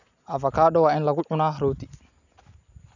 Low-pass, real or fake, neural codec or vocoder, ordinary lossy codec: 7.2 kHz; real; none; none